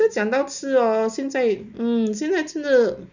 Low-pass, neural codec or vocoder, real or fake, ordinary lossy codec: 7.2 kHz; none; real; none